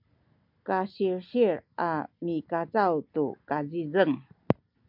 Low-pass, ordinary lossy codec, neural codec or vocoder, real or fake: 5.4 kHz; MP3, 48 kbps; none; real